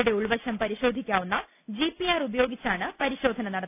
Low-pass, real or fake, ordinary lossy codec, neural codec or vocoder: 3.6 kHz; real; none; none